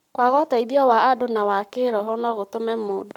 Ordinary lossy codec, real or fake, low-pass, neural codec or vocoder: none; fake; 19.8 kHz; codec, 44.1 kHz, 7.8 kbps, Pupu-Codec